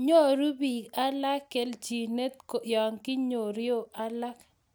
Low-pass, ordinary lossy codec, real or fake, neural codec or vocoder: none; none; real; none